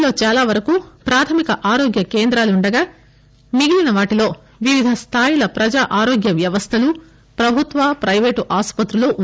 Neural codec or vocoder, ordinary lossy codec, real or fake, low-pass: none; none; real; none